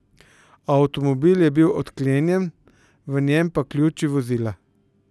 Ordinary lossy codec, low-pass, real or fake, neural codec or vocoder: none; none; real; none